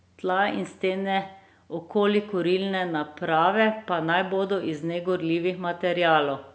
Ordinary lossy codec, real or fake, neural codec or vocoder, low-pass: none; real; none; none